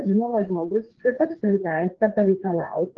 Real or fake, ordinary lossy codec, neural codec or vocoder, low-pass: fake; Opus, 16 kbps; codec, 16 kHz, 2 kbps, FreqCodec, larger model; 7.2 kHz